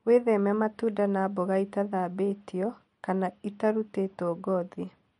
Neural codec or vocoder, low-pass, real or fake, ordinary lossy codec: none; 19.8 kHz; real; MP3, 48 kbps